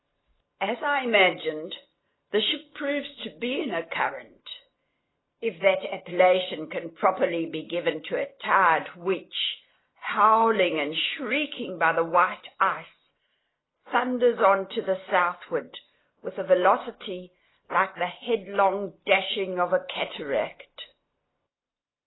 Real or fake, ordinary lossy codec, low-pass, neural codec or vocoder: real; AAC, 16 kbps; 7.2 kHz; none